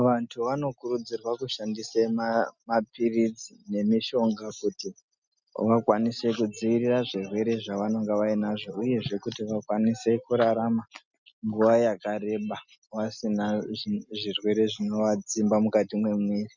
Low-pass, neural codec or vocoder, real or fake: 7.2 kHz; none; real